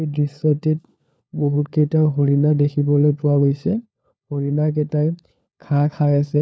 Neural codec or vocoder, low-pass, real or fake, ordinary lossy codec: codec, 16 kHz, 4 kbps, FunCodec, trained on LibriTTS, 50 frames a second; none; fake; none